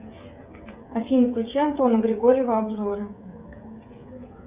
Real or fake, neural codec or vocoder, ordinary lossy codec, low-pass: fake; codec, 16 kHz, 16 kbps, FreqCodec, smaller model; Opus, 64 kbps; 3.6 kHz